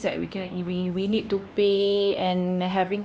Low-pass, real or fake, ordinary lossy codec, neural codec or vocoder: none; fake; none; codec, 16 kHz, 2 kbps, X-Codec, HuBERT features, trained on LibriSpeech